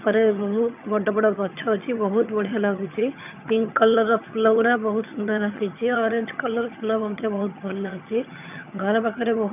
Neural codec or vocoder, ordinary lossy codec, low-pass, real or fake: vocoder, 22.05 kHz, 80 mel bands, HiFi-GAN; none; 3.6 kHz; fake